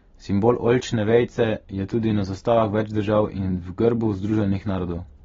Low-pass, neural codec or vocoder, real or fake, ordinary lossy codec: 7.2 kHz; none; real; AAC, 24 kbps